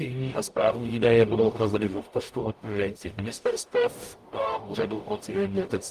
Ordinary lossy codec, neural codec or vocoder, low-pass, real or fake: Opus, 32 kbps; codec, 44.1 kHz, 0.9 kbps, DAC; 14.4 kHz; fake